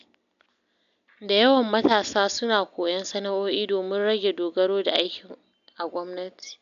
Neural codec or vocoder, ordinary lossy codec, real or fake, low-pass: none; none; real; 7.2 kHz